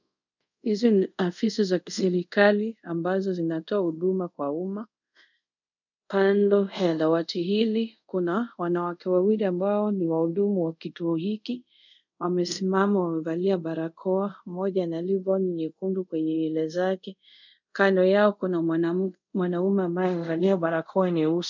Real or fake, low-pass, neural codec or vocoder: fake; 7.2 kHz; codec, 24 kHz, 0.5 kbps, DualCodec